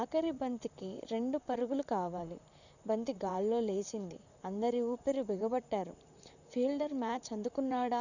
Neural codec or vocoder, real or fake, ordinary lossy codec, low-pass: vocoder, 44.1 kHz, 128 mel bands, Pupu-Vocoder; fake; none; 7.2 kHz